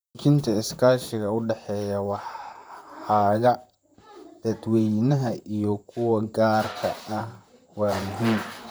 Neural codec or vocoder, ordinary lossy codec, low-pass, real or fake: vocoder, 44.1 kHz, 128 mel bands, Pupu-Vocoder; none; none; fake